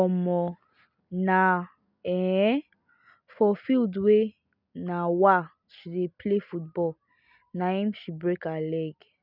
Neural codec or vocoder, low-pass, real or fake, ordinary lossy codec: none; 5.4 kHz; real; none